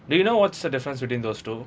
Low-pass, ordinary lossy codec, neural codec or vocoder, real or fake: none; none; none; real